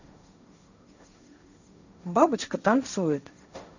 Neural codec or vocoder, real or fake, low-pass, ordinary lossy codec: codec, 16 kHz, 1.1 kbps, Voila-Tokenizer; fake; 7.2 kHz; none